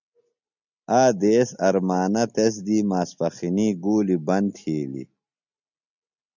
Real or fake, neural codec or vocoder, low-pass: real; none; 7.2 kHz